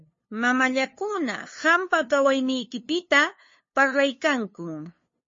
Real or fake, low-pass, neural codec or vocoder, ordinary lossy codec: fake; 7.2 kHz; codec, 16 kHz, 2 kbps, FunCodec, trained on LibriTTS, 25 frames a second; MP3, 32 kbps